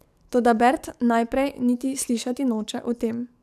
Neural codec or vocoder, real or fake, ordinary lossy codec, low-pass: codec, 44.1 kHz, 7.8 kbps, DAC; fake; none; 14.4 kHz